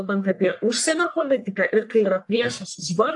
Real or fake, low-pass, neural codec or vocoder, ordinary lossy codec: fake; 10.8 kHz; codec, 44.1 kHz, 1.7 kbps, Pupu-Codec; AAC, 64 kbps